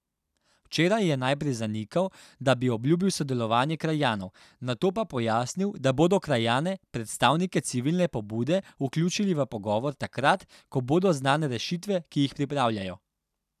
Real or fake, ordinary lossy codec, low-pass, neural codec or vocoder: real; none; 14.4 kHz; none